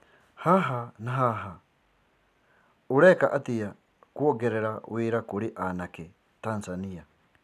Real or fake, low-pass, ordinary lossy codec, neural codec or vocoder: real; 14.4 kHz; none; none